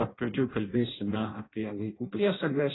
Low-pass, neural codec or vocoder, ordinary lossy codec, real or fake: 7.2 kHz; codec, 16 kHz in and 24 kHz out, 0.6 kbps, FireRedTTS-2 codec; AAC, 16 kbps; fake